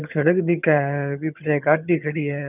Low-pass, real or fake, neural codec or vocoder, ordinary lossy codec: 3.6 kHz; fake; vocoder, 22.05 kHz, 80 mel bands, HiFi-GAN; none